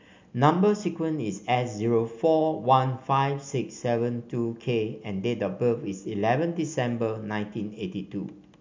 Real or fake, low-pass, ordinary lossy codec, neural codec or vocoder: real; 7.2 kHz; MP3, 64 kbps; none